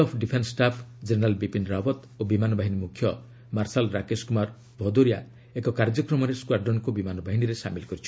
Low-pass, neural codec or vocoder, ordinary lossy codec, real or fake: none; none; none; real